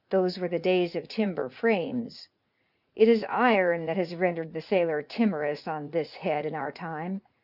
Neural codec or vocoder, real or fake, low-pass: vocoder, 44.1 kHz, 80 mel bands, Vocos; fake; 5.4 kHz